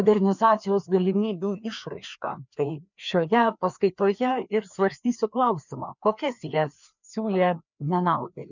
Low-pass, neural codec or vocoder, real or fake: 7.2 kHz; codec, 16 kHz, 2 kbps, FreqCodec, larger model; fake